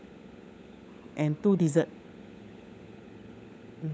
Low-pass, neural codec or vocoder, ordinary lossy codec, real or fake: none; codec, 16 kHz, 16 kbps, FunCodec, trained on LibriTTS, 50 frames a second; none; fake